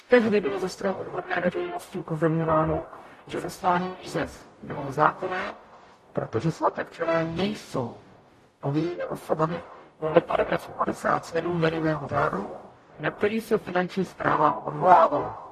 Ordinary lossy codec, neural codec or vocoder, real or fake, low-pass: AAC, 48 kbps; codec, 44.1 kHz, 0.9 kbps, DAC; fake; 14.4 kHz